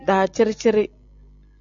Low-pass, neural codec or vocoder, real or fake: 7.2 kHz; none; real